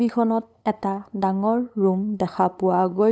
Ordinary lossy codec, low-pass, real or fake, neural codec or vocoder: none; none; fake; codec, 16 kHz, 8 kbps, FunCodec, trained on LibriTTS, 25 frames a second